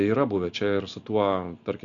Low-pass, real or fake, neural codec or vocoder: 7.2 kHz; real; none